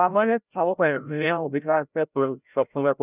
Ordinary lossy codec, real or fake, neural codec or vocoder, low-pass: none; fake; codec, 16 kHz, 0.5 kbps, FreqCodec, larger model; 3.6 kHz